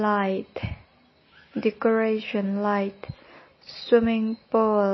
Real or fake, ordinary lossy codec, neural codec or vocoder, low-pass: real; MP3, 24 kbps; none; 7.2 kHz